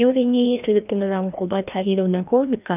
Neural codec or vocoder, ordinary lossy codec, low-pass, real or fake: codec, 16 kHz, 1 kbps, FreqCodec, larger model; none; 3.6 kHz; fake